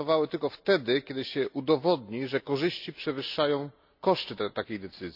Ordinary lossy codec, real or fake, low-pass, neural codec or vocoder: none; real; 5.4 kHz; none